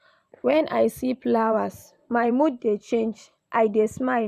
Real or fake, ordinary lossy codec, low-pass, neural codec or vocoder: fake; none; 14.4 kHz; vocoder, 44.1 kHz, 128 mel bands every 512 samples, BigVGAN v2